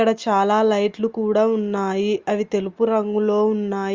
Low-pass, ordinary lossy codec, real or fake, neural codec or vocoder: 7.2 kHz; Opus, 32 kbps; real; none